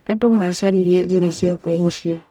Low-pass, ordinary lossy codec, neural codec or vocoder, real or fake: 19.8 kHz; none; codec, 44.1 kHz, 0.9 kbps, DAC; fake